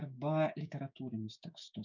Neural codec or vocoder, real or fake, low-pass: codec, 16 kHz, 6 kbps, DAC; fake; 7.2 kHz